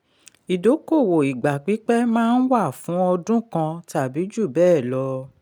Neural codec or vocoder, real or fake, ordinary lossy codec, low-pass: none; real; none; 19.8 kHz